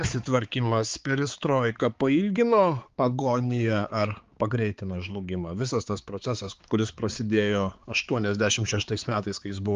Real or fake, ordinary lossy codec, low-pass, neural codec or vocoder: fake; Opus, 32 kbps; 7.2 kHz; codec, 16 kHz, 4 kbps, X-Codec, HuBERT features, trained on balanced general audio